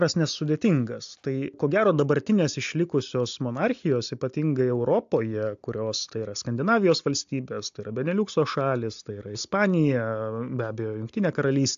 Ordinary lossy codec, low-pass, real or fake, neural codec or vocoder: AAC, 64 kbps; 7.2 kHz; real; none